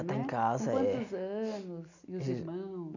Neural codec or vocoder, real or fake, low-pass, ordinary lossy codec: none; real; 7.2 kHz; none